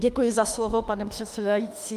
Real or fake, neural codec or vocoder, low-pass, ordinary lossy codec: fake; autoencoder, 48 kHz, 32 numbers a frame, DAC-VAE, trained on Japanese speech; 14.4 kHz; Opus, 32 kbps